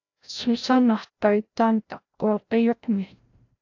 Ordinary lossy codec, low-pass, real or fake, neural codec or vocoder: AAC, 48 kbps; 7.2 kHz; fake; codec, 16 kHz, 0.5 kbps, FreqCodec, larger model